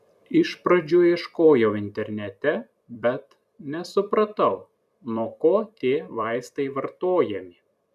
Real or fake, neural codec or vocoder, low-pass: fake; vocoder, 44.1 kHz, 128 mel bands every 256 samples, BigVGAN v2; 14.4 kHz